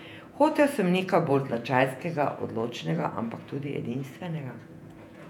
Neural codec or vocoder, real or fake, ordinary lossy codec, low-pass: vocoder, 48 kHz, 128 mel bands, Vocos; fake; none; 19.8 kHz